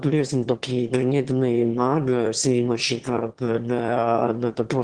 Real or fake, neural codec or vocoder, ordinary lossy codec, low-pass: fake; autoencoder, 22.05 kHz, a latent of 192 numbers a frame, VITS, trained on one speaker; Opus, 16 kbps; 9.9 kHz